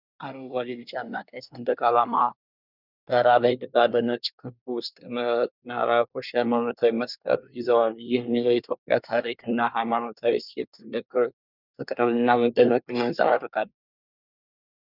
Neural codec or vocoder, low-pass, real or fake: codec, 24 kHz, 1 kbps, SNAC; 5.4 kHz; fake